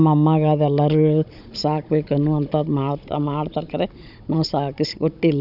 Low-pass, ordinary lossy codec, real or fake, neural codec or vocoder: 5.4 kHz; none; fake; codec, 16 kHz, 16 kbps, FunCodec, trained on Chinese and English, 50 frames a second